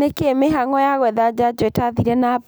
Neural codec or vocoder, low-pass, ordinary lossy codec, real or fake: none; none; none; real